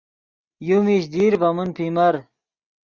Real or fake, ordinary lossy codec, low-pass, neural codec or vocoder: real; Opus, 64 kbps; 7.2 kHz; none